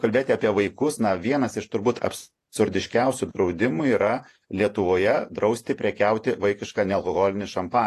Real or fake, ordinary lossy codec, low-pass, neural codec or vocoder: fake; AAC, 48 kbps; 14.4 kHz; vocoder, 48 kHz, 128 mel bands, Vocos